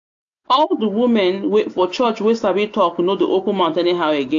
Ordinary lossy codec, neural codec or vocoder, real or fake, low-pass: AAC, 64 kbps; none; real; 7.2 kHz